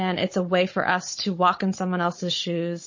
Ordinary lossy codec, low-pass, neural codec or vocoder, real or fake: MP3, 32 kbps; 7.2 kHz; codec, 16 kHz, 16 kbps, FunCodec, trained on Chinese and English, 50 frames a second; fake